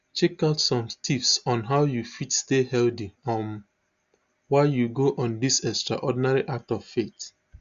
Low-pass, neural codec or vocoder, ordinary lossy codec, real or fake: 7.2 kHz; none; Opus, 64 kbps; real